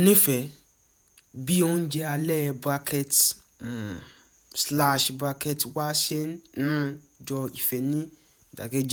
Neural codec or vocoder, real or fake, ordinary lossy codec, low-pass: vocoder, 48 kHz, 128 mel bands, Vocos; fake; none; none